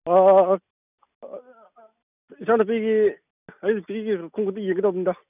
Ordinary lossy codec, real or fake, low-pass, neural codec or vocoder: none; real; 3.6 kHz; none